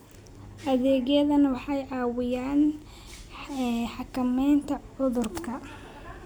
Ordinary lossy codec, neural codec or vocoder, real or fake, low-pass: none; none; real; none